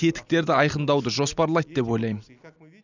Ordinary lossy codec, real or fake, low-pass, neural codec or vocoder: none; real; 7.2 kHz; none